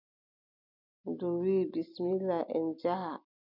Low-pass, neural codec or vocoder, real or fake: 5.4 kHz; vocoder, 44.1 kHz, 128 mel bands every 256 samples, BigVGAN v2; fake